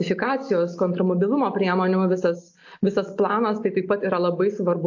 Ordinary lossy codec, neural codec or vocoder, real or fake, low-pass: AAC, 48 kbps; none; real; 7.2 kHz